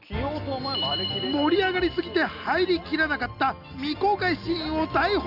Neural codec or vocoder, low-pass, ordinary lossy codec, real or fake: none; 5.4 kHz; none; real